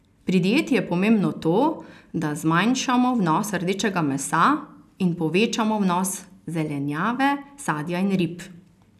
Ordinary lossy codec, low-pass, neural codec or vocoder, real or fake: none; 14.4 kHz; none; real